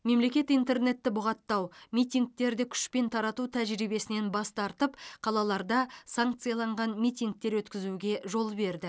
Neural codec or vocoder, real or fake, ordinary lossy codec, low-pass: none; real; none; none